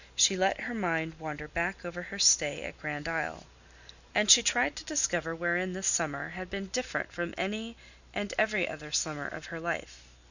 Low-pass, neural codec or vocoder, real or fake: 7.2 kHz; none; real